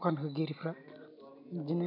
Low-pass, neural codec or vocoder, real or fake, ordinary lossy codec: 5.4 kHz; none; real; none